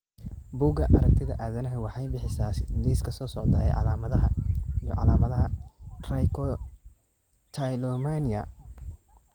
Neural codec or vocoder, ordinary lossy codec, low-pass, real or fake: none; Opus, 24 kbps; 19.8 kHz; real